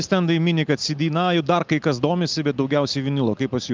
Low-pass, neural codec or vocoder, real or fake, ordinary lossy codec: 7.2 kHz; none; real; Opus, 32 kbps